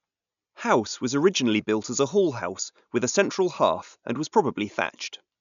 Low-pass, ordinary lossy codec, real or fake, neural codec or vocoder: 7.2 kHz; none; real; none